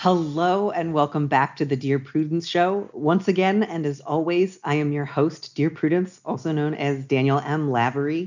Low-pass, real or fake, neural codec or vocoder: 7.2 kHz; real; none